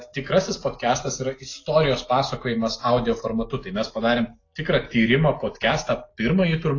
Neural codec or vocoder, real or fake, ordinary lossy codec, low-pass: none; real; AAC, 32 kbps; 7.2 kHz